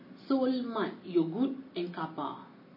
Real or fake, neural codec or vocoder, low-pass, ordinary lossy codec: real; none; 5.4 kHz; MP3, 24 kbps